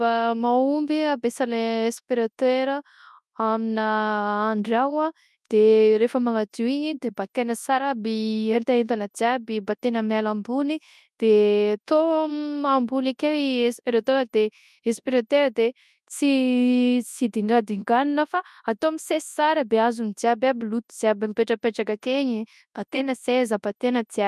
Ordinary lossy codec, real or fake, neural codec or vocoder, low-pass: none; fake; codec, 24 kHz, 0.9 kbps, WavTokenizer, large speech release; none